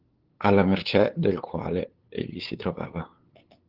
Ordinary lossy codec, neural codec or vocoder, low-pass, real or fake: Opus, 16 kbps; codec, 16 kHz, 8 kbps, FunCodec, trained on LibriTTS, 25 frames a second; 5.4 kHz; fake